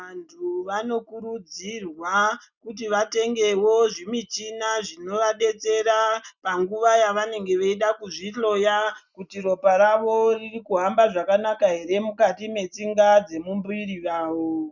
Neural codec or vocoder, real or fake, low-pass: none; real; 7.2 kHz